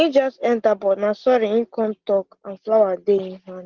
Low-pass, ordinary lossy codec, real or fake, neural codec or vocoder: 7.2 kHz; Opus, 16 kbps; real; none